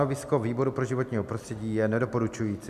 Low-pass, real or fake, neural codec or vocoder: 14.4 kHz; real; none